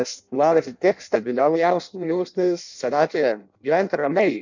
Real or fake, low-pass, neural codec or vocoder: fake; 7.2 kHz; codec, 16 kHz in and 24 kHz out, 0.6 kbps, FireRedTTS-2 codec